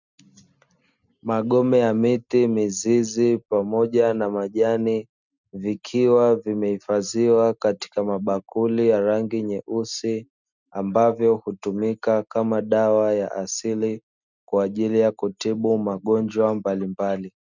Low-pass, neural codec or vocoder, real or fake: 7.2 kHz; none; real